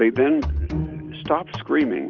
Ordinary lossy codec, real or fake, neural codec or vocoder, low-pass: Opus, 32 kbps; real; none; 7.2 kHz